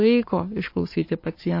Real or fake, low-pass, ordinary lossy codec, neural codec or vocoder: fake; 5.4 kHz; MP3, 32 kbps; codec, 44.1 kHz, 7.8 kbps, Pupu-Codec